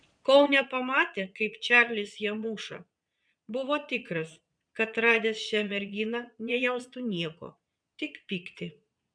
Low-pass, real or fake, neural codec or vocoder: 9.9 kHz; fake; vocoder, 22.05 kHz, 80 mel bands, Vocos